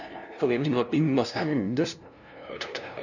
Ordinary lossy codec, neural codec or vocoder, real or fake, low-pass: none; codec, 16 kHz, 0.5 kbps, FunCodec, trained on LibriTTS, 25 frames a second; fake; 7.2 kHz